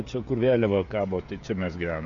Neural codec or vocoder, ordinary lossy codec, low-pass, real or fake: codec, 16 kHz, 16 kbps, FreqCodec, smaller model; AAC, 64 kbps; 7.2 kHz; fake